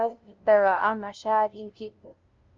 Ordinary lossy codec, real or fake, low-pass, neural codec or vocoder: Opus, 24 kbps; fake; 7.2 kHz; codec, 16 kHz, 0.5 kbps, FunCodec, trained on LibriTTS, 25 frames a second